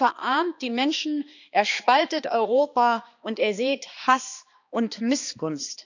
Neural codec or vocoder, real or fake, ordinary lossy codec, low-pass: codec, 16 kHz, 2 kbps, X-Codec, HuBERT features, trained on balanced general audio; fake; none; 7.2 kHz